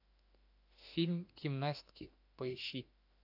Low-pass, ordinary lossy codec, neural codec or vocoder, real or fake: 5.4 kHz; AAC, 48 kbps; autoencoder, 48 kHz, 32 numbers a frame, DAC-VAE, trained on Japanese speech; fake